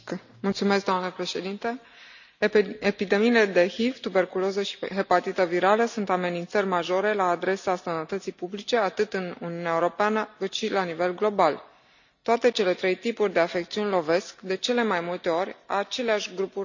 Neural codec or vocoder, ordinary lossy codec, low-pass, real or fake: none; none; 7.2 kHz; real